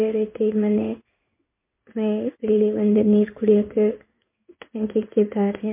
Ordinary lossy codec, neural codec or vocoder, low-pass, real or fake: MP3, 32 kbps; codec, 16 kHz in and 24 kHz out, 1 kbps, XY-Tokenizer; 3.6 kHz; fake